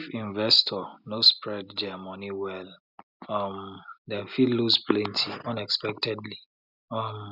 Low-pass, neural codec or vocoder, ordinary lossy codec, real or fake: 5.4 kHz; none; none; real